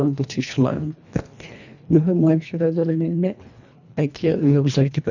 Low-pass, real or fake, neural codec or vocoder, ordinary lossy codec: 7.2 kHz; fake; codec, 24 kHz, 1.5 kbps, HILCodec; none